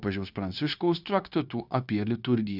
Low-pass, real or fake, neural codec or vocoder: 5.4 kHz; fake; codec, 16 kHz, 0.9 kbps, LongCat-Audio-Codec